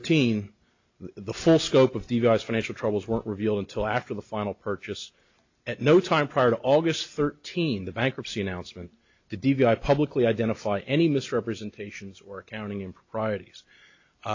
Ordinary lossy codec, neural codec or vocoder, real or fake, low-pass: AAC, 48 kbps; none; real; 7.2 kHz